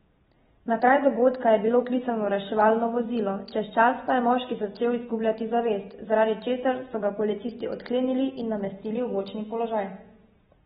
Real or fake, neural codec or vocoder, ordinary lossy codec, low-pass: real; none; AAC, 16 kbps; 7.2 kHz